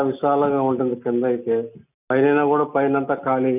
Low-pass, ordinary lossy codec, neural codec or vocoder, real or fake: 3.6 kHz; none; none; real